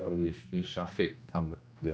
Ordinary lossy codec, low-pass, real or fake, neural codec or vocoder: none; none; fake; codec, 16 kHz, 1 kbps, X-Codec, HuBERT features, trained on general audio